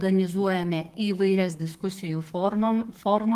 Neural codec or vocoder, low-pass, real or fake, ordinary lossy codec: codec, 32 kHz, 1.9 kbps, SNAC; 14.4 kHz; fake; Opus, 24 kbps